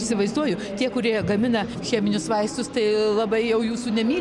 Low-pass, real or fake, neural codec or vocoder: 10.8 kHz; real; none